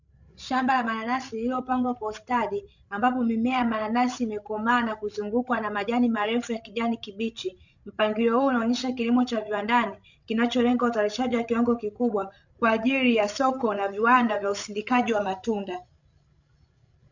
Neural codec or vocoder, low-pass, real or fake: codec, 16 kHz, 16 kbps, FreqCodec, larger model; 7.2 kHz; fake